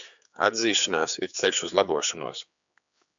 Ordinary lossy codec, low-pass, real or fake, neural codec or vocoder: MP3, 64 kbps; 7.2 kHz; fake; codec, 16 kHz, 4 kbps, X-Codec, HuBERT features, trained on general audio